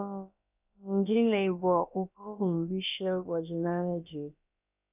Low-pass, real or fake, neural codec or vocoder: 3.6 kHz; fake; codec, 16 kHz, about 1 kbps, DyCAST, with the encoder's durations